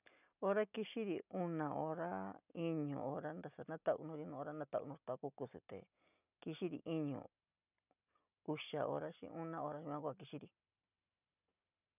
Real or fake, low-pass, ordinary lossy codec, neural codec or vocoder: real; 3.6 kHz; none; none